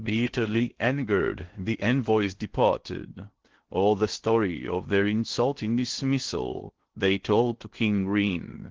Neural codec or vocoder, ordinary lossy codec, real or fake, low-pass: codec, 16 kHz in and 24 kHz out, 0.6 kbps, FocalCodec, streaming, 2048 codes; Opus, 16 kbps; fake; 7.2 kHz